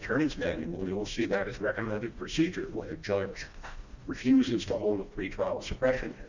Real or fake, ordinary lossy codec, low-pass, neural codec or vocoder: fake; Opus, 64 kbps; 7.2 kHz; codec, 16 kHz, 1 kbps, FreqCodec, smaller model